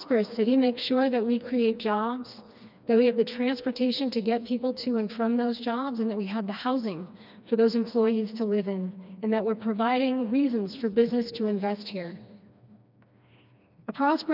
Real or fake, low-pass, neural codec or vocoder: fake; 5.4 kHz; codec, 16 kHz, 2 kbps, FreqCodec, smaller model